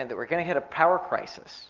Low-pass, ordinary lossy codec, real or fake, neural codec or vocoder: 7.2 kHz; Opus, 32 kbps; real; none